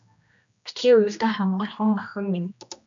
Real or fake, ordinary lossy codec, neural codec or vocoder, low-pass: fake; MP3, 64 kbps; codec, 16 kHz, 1 kbps, X-Codec, HuBERT features, trained on general audio; 7.2 kHz